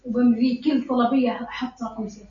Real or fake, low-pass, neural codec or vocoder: real; 7.2 kHz; none